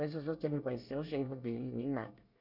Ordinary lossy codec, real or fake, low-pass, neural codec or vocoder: none; fake; 5.4 kHz; codec, 24 kHz, 1 kbps, SNAC